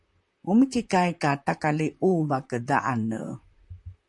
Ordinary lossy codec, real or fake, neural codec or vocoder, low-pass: MP3, 64 kbps; fake; vocoder, 44.1 kHz, 128 mel bands, Pupu-Vocoder; 10.8 kHz